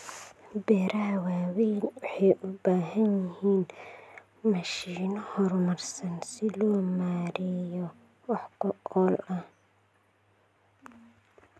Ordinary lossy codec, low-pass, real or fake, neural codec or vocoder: none; none; real; none